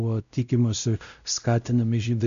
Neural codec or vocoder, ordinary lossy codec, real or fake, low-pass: codec, 16 kHz, 0.5 kbps, X-Codec, WavLM features, trained on Multilingual LibriSpeech; MP3, 64 kbps; fake; 7.2 kHz